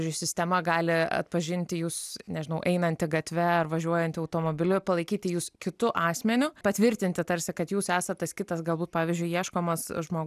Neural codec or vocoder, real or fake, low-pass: none; real; 14.4 kHz